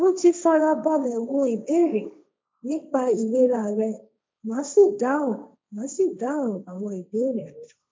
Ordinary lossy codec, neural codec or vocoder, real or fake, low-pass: none; codec, 16 kHz, 1.1 kbps, Voila-Tokenizer; fake; none